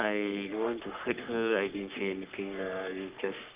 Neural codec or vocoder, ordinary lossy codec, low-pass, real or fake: codec, 44.1 kHz, 3.4 kbps, Pupu-Codec; Opus, 24 kbps; 3.6 kHz; fake